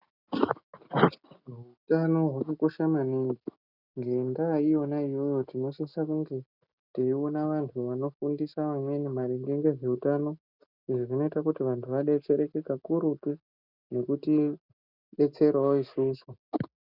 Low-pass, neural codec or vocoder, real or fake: 5.4 kHz; none; real